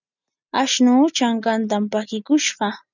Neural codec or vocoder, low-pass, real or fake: none; 7.2 kHz; real